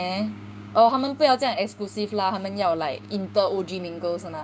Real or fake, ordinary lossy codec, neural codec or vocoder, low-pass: fake; none; codec, 16 kHz, 6 kbps, DAC; none